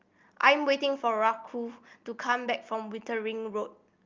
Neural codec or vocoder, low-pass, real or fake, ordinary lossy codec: none; 7.2 kHz; real; Opus, 24 kbps